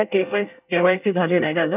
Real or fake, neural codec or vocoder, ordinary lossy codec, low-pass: fake; codec, 24 kHz, 1 kbps, SNAC; none; 3.6 kHz